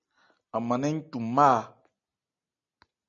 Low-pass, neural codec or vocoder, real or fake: 7.2 kHz; none; real